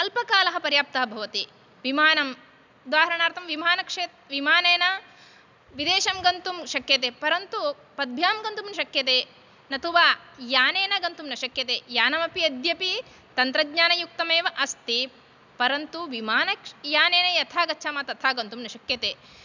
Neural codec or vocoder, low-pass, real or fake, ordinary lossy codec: none; 7.2 kHz; real; none